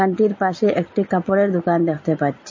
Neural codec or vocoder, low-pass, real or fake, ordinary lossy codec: none; 7.2 kHz; real; MP3, 32 kbps